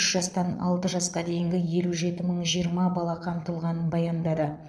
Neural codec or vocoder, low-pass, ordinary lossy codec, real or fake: vocoder, 22.05 kHz, 80 mel bands, Vocos; none; none; fake